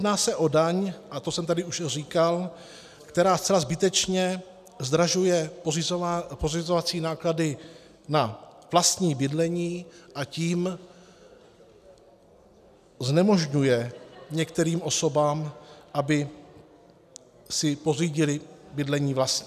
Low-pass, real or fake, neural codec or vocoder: 14.4 kHz; real; none